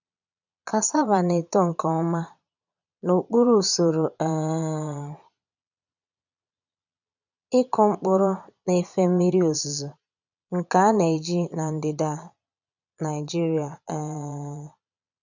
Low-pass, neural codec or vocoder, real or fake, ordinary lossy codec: 7.2 kHz; vocoder, 22.05 kHz, 80 mel bands, Vocos; fake; none